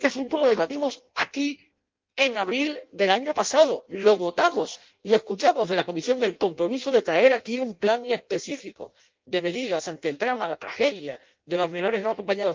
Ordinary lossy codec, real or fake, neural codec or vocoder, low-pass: Opus, 24 kbps; fake; codec, 16 kHz in and 24 kHz out, 0.6 kbps, FireRedTTS-2 codec; 7.2 kHz